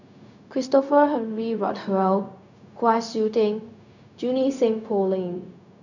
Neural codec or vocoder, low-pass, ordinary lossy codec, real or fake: codec, 16 kHz, 0.4 kbps, LongCat-Audio-Codec; 7.2 kHz; none; fake